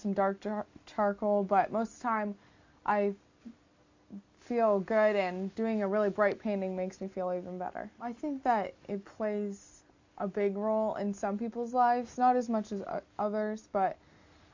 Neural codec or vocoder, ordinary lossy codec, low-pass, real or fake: none; AAC, 48 kbps; 7.2 kHz; real